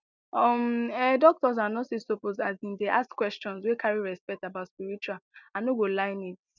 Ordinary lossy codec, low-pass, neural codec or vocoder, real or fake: none; 7.2 kHz; none; real